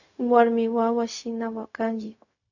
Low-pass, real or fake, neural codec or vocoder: 7.2 kHz; fake; codec, 16 kHz, 0.4 kbps, LongCat-Audio-Codec